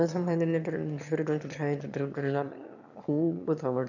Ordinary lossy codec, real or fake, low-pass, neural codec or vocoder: none; fake; 7.2 kHz; autoencoder, 22.05 kHz, a latent of 192 numbers a frame, VITS, trained on one speaker